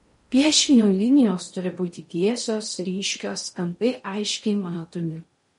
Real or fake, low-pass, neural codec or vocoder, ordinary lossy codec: fake; 10.8 kHz; codec, 16 kHz in and 24 kHz out, 0.6 kbps, FocalCodec, streaming, 4096 codes; MP3, 48 kbps